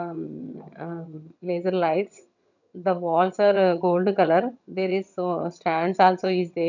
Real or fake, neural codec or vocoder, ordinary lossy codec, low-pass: fake; vocoder, 22.05 kHz, 80 mel bands, HiFi-GAN; none; 7.2 kHz